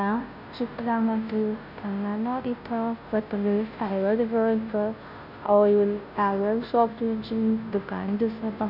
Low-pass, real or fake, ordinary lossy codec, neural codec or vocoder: 5.4 kHz; fake; none; codec, 16 kHz, 0.5 kbps, FunCodec, trained on Chinese and English, 25 frames a second